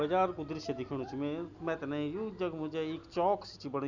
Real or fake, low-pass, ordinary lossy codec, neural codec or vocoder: real; 7.2 kHz; none; none